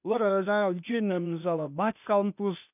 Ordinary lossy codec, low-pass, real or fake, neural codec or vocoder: none; 3.6 kHz; fake; codec, 16 kHz, 0.8 kbps, ZipCodec